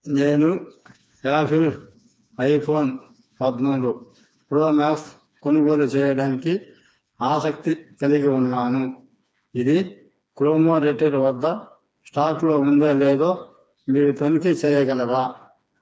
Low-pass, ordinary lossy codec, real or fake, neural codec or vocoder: none; none; fake; codec, 16 kHz, 2 kbps, FreqCodec, smaller model